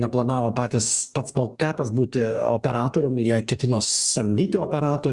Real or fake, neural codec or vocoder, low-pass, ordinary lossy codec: fake; codec, 44.1 kHz, 2.6 kbps, DAC; 10.8 kHz; Opus, 64 kbps